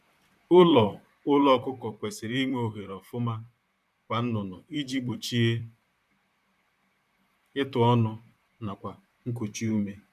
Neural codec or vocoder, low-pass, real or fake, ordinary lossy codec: vocoder, 44.1 kHz, 128 mel bands, Pupu-Vocoder; 14.4 kHz; fake; none